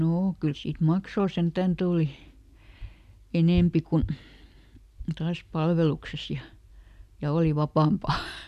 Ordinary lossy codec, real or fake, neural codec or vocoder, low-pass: none; real; none; 14.4 kHz